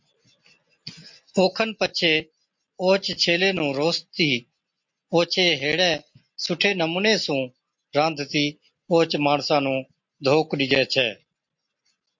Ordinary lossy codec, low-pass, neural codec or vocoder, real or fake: MP3, 48 kbps; 7.2 kHz; none; real